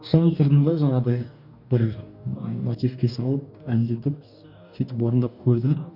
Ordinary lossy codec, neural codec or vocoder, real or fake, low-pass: none; codec, 44.1 kHz, 2.6 kbps, DAC; fake; 5.4 kHz